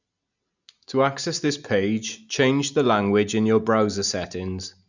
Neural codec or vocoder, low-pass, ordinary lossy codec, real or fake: none; 7.2 kHz; none; real